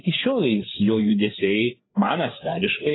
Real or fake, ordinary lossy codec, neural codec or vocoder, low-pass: fake; AAC, 16 kbps; codec, 16 kHz, 4 kbps, FreqCodec, larger model; 7.2 kHz